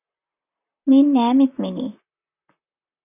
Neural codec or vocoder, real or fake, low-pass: vocoder, 44.1 kHz, 128 mel bands, Pupu-Vocoder; fake; 3.6 kHz